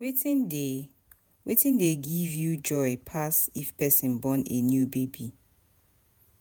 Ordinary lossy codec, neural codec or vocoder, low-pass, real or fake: none; vocoder, 48 kHz, 128 mel bands, Vocos; none; fake